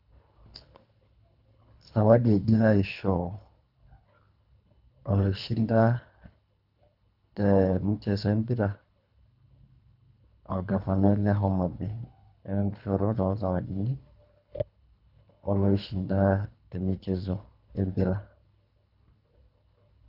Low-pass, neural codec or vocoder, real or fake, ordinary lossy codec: 5.4 kHz; codec, 24 kHz, 3 kbps, HILCodec; fake; none